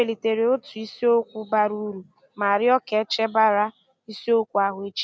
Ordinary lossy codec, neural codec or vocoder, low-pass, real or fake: none; none; none; real